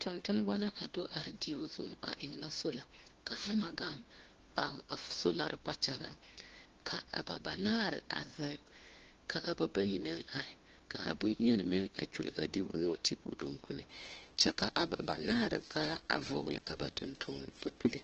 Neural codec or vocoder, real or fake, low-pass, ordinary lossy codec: codec, 16 kHz, 1 kbps, FunCodec, trained on LibriTTS, 50 frames a second; fake; 7.2 kHz; Opus, 16 kbps